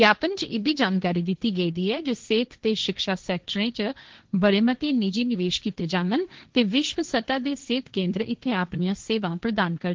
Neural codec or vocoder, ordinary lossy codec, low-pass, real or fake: codec, 16 kHz, 1.1 kbps, Voila-Tokenizer; Opus, 16 kbps; 7.2 kHz; fake